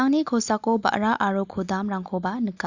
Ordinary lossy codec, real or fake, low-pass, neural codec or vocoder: Opus, 64 kbps; real; 7.2 kHz; none